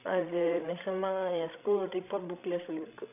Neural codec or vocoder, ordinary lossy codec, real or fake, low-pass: codec, 16 kHz, 16 kbps, FreqCodec, larger model; none; fake; 3.6 kHz